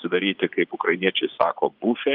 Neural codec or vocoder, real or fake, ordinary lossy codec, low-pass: vocoder, 24 kHz, 100 mel bands, Vocos; fake; Opus, 32 kbps; 5.4 kHz